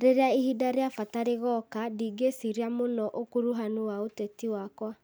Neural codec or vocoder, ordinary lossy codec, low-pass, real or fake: none; none; none; real